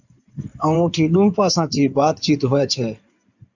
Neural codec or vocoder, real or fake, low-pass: codec, 16 kHz in and 24 kHz out, 2.2 kbps, FireRedTTS-2 codec; fake; 7.2 kHz